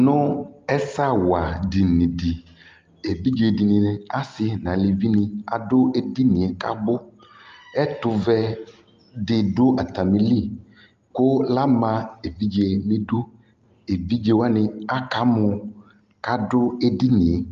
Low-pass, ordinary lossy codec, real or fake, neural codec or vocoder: 7.2 kHz; Opus, 24 kbps; real; none